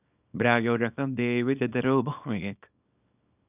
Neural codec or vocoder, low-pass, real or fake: codec, 24 kHz, 0.9 kbps, WavTokenizer, small release; 3.6 kHz; fake